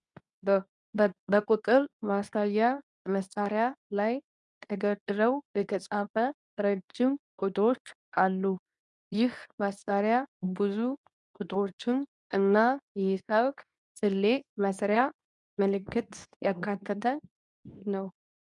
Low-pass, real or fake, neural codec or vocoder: 10.8 kHz; fake; codec, 24 kHz, 0.9 kbps, WavTokenizer, medium speech release version 2